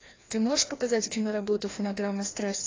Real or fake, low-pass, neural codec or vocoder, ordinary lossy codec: fake; 7.2 kHz; codec, 16 kHz, 1 kbps, FreqCodec, larger model; AAC, 32 kbps